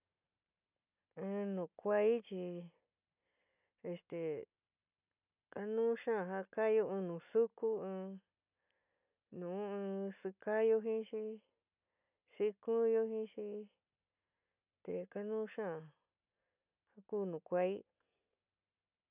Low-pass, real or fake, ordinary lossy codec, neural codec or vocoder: 3.6 kHz; real; none; none